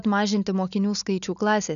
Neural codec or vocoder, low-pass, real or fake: none; 7.2 kHz; real